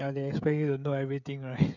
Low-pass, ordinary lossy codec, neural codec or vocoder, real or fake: 7.2 kHz; none; codec, 16 kHz, 16 kbps, FreqCodec, smaller model; fake